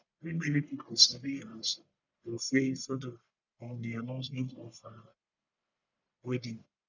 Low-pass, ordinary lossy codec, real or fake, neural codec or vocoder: 7.2 kHz; none; fake; codec, 44.1 kHz, 1.7 kbps, Pupu-Codec